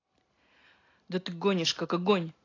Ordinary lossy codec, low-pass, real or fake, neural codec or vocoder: AAC, 32 kbps; 7.2 kHz; real; none